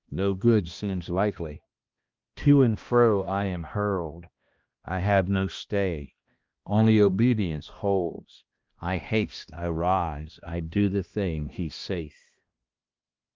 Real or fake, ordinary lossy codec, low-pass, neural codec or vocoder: fake; Opus, 32 kbps; 7.2 kHz; codec, 16 kHz, 1 kbps, X-Codec, HuBERT features, trained on balanced general audio